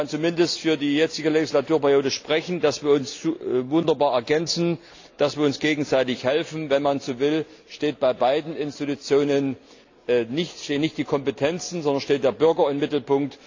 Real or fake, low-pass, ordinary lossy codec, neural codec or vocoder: real; 7.2 kHz; AAC, 48 kbps; none